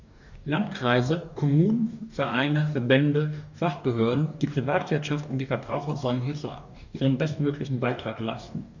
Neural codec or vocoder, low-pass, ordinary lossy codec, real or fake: codec, 44.1 kHz, 2.6 kbps, DAC; 7.2 kHz; none; fake